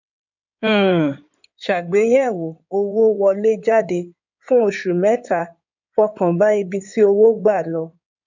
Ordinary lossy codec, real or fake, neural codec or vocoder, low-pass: none; fake; codec, 16 kHz in and 24 kHz out, 2.2 kbps, FireRedTTS-2 codec; 7.2 kHz